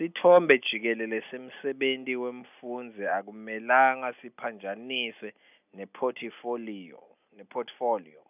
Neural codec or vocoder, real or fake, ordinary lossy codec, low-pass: none; real; none; 3.6 kHz